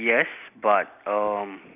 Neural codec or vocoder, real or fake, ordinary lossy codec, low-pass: none; real; none; 3.6 kHz